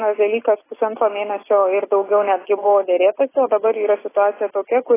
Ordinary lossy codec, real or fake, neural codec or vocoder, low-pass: AAC, 16 kbps; real; none; 3.6 kHz